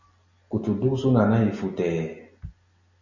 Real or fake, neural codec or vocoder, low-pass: real; none; 7.2 kHz